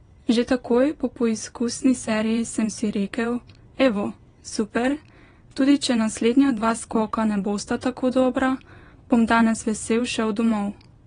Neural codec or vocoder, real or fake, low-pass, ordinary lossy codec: vocoder, 22.05 kHz, 80 mel bands, WaveNeXt; fake; 9.9 kHz; AAC, 32 kbps